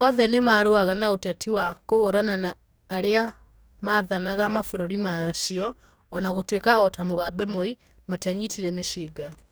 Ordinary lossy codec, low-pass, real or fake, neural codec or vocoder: none; none; fake; codec, 44.1 kHz, 2.6 kbps, DAC